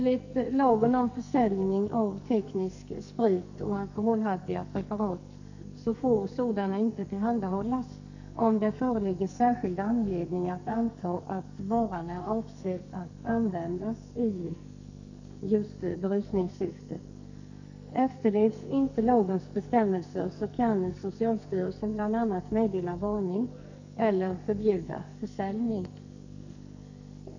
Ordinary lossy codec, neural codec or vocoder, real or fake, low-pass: none; codec, 44.1 kHz, 2.6 kbps, SNAC; fake; 7.2 kHz